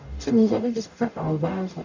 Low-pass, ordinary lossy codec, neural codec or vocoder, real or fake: 7.2 kHz; Opus, 64 kbps; codec, 44.1 kHz, 0.9 kbps, DAC; fake